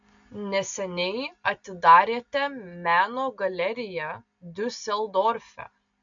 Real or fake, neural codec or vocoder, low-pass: real; none; 7.2 kHz